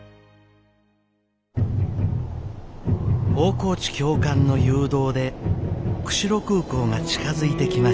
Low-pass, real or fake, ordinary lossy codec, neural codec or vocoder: none; real; none; none